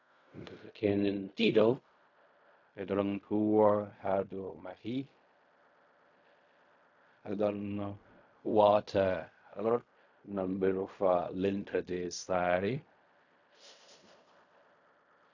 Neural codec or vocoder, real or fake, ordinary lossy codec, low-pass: codec, 16 kHz in and 24 kHz out, 0.4 kbps, LongCat-Audio-Codec, fine tuned four codebook decoder; fake; AAC, 48 kbps; 7.2 kHz